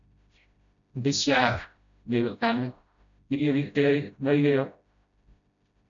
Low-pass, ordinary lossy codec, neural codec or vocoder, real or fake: 7.2 kHz; MP3, 96 kbps; codec, 16 kHz, 0.5 kbps, FreqCodec, smaller model; fake